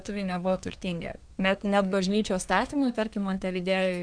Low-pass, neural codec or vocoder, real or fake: 9.9 kHz; codec, 24 kHz, 1 kbps, SNAC; fake